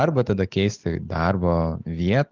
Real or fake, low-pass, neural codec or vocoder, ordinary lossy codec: real; 7.2 kHz; none; Opus, 32 kbps